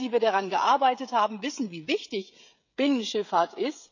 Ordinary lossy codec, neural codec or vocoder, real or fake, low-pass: none; codec, 16 kHz, 16 kbps, FreqCodec, smaller model; fake; 7.2 kHz